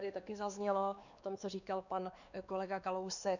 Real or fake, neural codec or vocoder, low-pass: fake; codec, 16 kHz, 2 kbps, X-Codec, WavLM features, trained on Multilingual LibriSpeech; 7.2 kHz